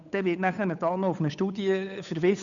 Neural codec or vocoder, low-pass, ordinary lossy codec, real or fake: codec, 16 kHz, 8 kbps, FunCodec, trained on Chinese and English, 25 frames a second; 7.2 kHz; none; fake